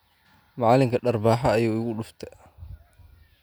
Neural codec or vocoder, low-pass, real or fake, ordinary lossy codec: none; none; real; none